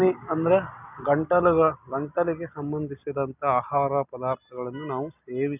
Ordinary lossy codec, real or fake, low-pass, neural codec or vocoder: none; real; 3.6 kHz; none